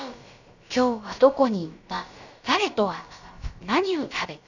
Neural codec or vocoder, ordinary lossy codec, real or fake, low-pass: codec, 16 kHz, about 1 kbps, DyCAST, with the encoder's durations; none; fake; 7.2 kHz